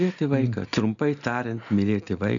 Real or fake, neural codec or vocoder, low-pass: real; none; 7.2 kHz